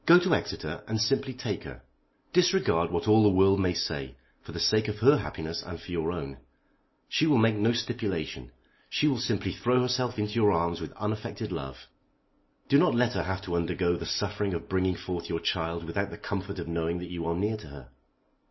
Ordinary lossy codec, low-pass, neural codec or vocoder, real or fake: MP3, 24 kbps; 7.2 kHz; none; real